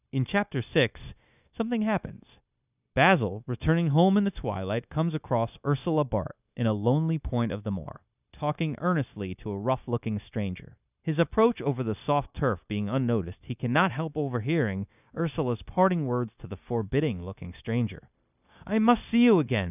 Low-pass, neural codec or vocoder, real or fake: 3.6 kHz; codec, 16 kHz, 0.9 kbps, LongCat-Audio-Codec; fake